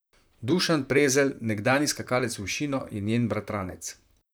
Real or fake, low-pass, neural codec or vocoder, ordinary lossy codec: fake; none; vocoder, 44.1 kHz, 128 mel bands, Pupu-Vocoder; none